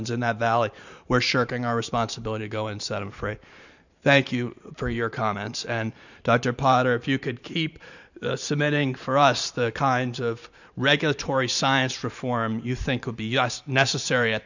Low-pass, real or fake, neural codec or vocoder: 7.2 kHz; fake; vocoder, 44.1 kHz, 128 mel bands every 512 samples, BigVGAN v2